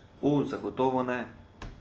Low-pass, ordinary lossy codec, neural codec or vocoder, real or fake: 7.2 kHz; Opus, 24 kbps; none; real